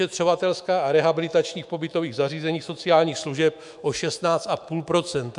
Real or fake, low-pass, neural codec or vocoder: fake; 10.8 kHz; autoencoder, 48 kHz, 128 numbers a frame, DAC-VAE, trained on Japanese speech